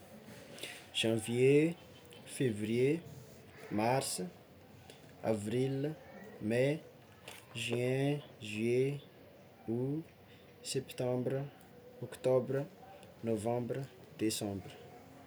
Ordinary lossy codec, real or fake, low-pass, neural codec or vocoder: none; real; none; none